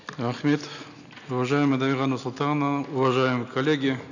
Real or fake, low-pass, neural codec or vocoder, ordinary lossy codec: real; 7.2 kHz; none; none